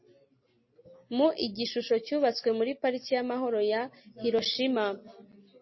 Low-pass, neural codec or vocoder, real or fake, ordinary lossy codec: 7.2 kHz; none; real; MP3, 24 kbps